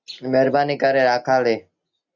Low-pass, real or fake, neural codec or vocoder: 7.2 kHz; real; none